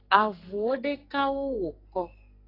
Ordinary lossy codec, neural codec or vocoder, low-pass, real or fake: AAC, 24 kbps; codec, 44.1 kHz, 2.6 kbps, SNAC; 5.4 kHz; fake